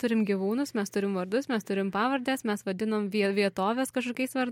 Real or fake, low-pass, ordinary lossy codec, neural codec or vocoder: real; 19.8 kHz; MP3, 64 kbps; none